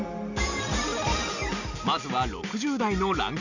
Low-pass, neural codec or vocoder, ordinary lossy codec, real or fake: 7.2 kHz; none; none; real